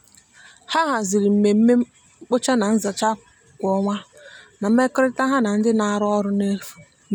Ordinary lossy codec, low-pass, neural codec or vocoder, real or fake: none; 19.8 kHz; none; real